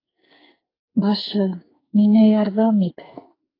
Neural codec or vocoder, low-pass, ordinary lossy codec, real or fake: codec, 44.1 kHz, 2.6 kbps, SNAC; 5.4 kHz; AAC, 24 kbps; fake